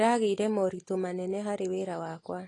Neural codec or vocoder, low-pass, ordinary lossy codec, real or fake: none; 10.8 kHz; AAC, 32 kbps; real